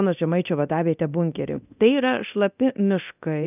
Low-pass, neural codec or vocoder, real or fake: 3.6 kHz; codec, 24 kHz, 0.9 kbps, DualCodec; fake